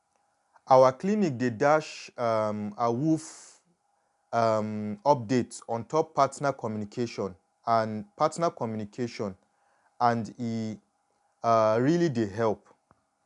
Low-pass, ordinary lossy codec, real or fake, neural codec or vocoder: 10.8 kHz; none; real; none